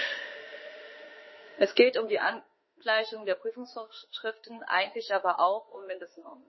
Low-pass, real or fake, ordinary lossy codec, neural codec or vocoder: 7.2 kHz; fake; MP3, 24 kbps; autoencoder, 48 kHz, 32 numbers a frame, DAC-VAE, trained on Japanese speech